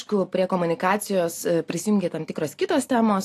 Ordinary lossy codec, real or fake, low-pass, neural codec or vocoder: AAC, 64 kbps; real; 14.4 kHz; none